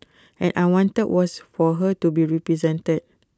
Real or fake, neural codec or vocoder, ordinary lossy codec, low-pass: real; none; none; none